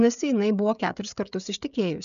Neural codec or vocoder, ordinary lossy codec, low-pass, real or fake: codec, 16 kHz, 16 kbps, FreqCodec, smaller model; MP3, 96 kbps; 7.2 kHz; fake